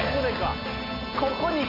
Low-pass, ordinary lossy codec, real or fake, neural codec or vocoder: 5.4 kHz; none; real; none